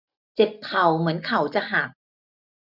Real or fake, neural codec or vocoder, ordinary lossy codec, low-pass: real; none; none; 5.4 kHz